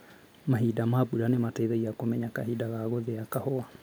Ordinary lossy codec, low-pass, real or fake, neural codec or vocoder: none; none; real; none